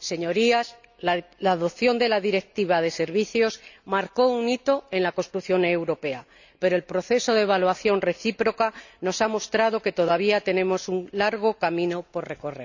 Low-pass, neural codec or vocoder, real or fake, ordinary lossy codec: 7.2 kHz; none; real; none